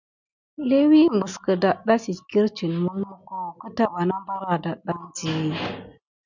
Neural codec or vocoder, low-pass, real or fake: none; 7.2 kHz; real